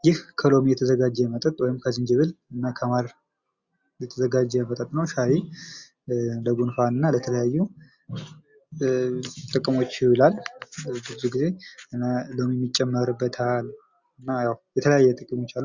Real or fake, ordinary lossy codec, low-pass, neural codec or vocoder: real; Opus, 64 kbps; 7.2 kHz; none